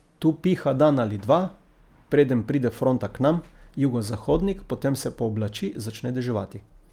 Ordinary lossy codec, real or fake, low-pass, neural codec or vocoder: Opus, 32 kbps; real; 19.8 kHz; none